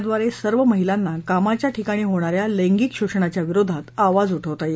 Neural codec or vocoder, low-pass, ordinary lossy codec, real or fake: none; none; none; real